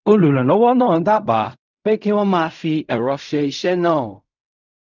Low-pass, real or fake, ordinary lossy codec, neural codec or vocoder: 7.2 kHz; fake; none; codec, 16 kHz in and 24 kHz out, 0.4 kbps, LongCat-Audio-Codec, fine tuned four codebook decoder